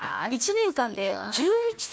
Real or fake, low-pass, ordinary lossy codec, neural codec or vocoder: fake; none; none; codec, 16 kHz, 1 kbps, FunCodec, trained on Chinese and English, 50 frames a second